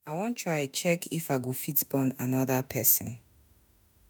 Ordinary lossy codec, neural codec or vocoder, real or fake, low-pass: none; autoencoder, 48 kHz, 32 numbers a frame, DAC-VAE, trained on Japanese speech; fake; none